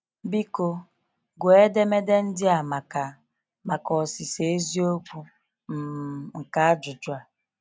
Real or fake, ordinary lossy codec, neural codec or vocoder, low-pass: real; none; none; none